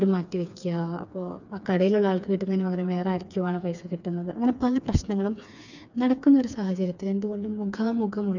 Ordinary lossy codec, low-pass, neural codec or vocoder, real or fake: none; 7.2 kHz; codec, 16 kHz, 4 kbps, FreqCodec, smaller model; fake